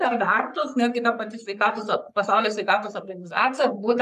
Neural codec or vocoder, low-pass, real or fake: codec, 44.1 kHz, 3.4 kbps, Pupu-Codec; 14.4 kHz; fake